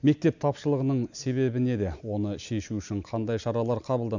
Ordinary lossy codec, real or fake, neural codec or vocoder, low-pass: none; real; none; 7.2 kHz